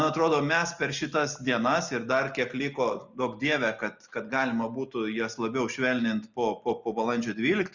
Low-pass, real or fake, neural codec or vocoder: 7.2 kHz; real; none